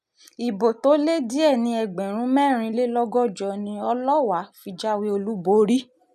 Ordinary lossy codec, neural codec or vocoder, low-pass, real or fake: none; none; 14.4 kHz; real